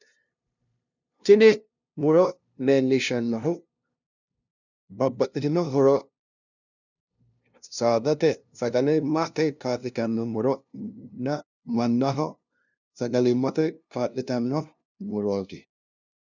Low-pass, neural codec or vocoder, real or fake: 7.2 kHz; codec, 16 kHz, 0.5 kbps, FunCodec, trained on LibriTTS, 25 frames a second; fake